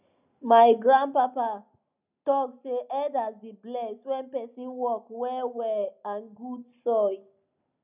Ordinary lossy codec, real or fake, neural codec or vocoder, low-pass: none; real; none; 3.6 kHz